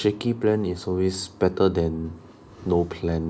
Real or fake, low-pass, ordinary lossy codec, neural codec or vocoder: real; none; none; none